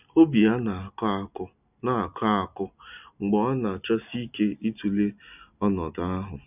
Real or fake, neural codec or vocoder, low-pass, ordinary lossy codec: real; none; 3.6 kHz; none